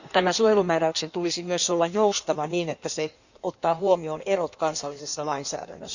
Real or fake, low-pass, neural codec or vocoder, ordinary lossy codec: fake; 7.2 kHz; codec, 16 kHz in and 24 kHz out, 1.1 kbps, FireRedTTS-2 codec; none